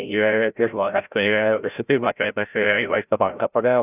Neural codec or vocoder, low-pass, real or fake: codec, 16 kHz, 0.5 kbps, FreqCodec, larger model; 3.6 kHz; fake